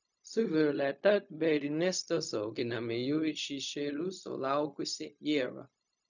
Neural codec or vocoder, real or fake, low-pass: codec, 16 kHz, 0.4 kbps, LongCat-Audio-Codec; fake; 7.2 kHz